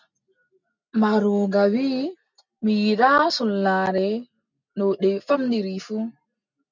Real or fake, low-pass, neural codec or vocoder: real; 7.2 kHz; none